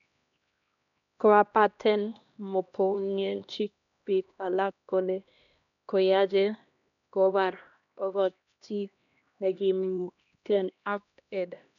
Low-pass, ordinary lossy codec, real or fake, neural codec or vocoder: 7.2 kHz; none; fake; codec, 16 kHz, 1 kbps, X-Codec, HuBERT features, trained on LibriSpeech